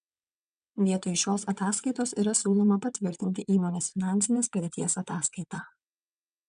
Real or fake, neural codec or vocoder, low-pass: fake; vocoder, 22.05 kHz, 80 mel bands, WaveNeXt; 9.9 kHz